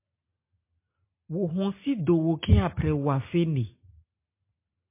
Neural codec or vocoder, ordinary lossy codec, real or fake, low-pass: none; MP3, 24 kbps; real; 3.6 kHz